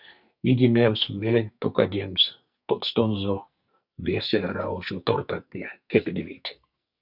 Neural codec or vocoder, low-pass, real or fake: codec, 44.1 kHz, 2.6 kbps, SNAC; 5.4 kHz; fake